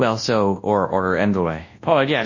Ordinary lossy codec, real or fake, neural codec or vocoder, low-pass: MP3, 32 kbps; fake; codec, 24 kHz, 0.9 kbps, WavTokenizer, large speech release; 7.2 kHz